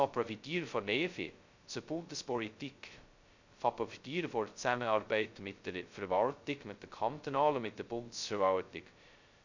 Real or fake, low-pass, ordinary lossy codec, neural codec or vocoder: fake; 7.2 kHz; Opus, 64 kbps; codec, 16 kHz, 0.2 kbps, FocalCodec